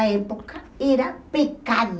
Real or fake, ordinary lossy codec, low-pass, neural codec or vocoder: real; none; none; none